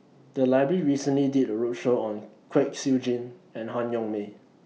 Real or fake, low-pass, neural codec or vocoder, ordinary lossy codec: real; none; none; none